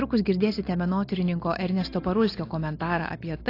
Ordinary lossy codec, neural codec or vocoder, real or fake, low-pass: AAC, 32 kbps; none; real; 5.4 kHz